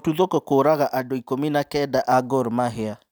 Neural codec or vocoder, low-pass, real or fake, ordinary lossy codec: none; none; real; none